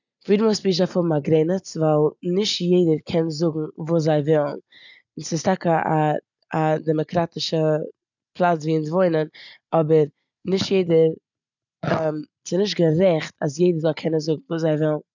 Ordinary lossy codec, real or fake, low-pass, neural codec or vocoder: none; real; 7.2 kHz; none